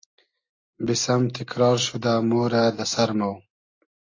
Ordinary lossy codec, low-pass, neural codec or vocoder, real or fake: AAC, 32 kbps; 7.2 kHz; none; real